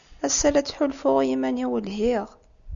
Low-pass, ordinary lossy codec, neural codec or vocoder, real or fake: 7.2 kHz; Opus, 64 kbps; none; real